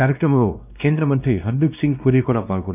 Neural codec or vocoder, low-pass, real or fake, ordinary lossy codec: codec, 16 kHz, 1 kbps, X-Codec, WavLM features, trained on Multilingual LibriSpeech; 3.6 kHz; fake; none